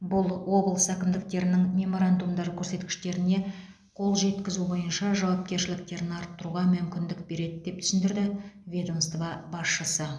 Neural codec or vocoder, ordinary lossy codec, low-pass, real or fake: none; none; none; real